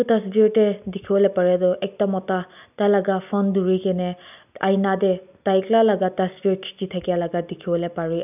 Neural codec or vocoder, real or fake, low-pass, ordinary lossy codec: none; real; 3.6 kHz; none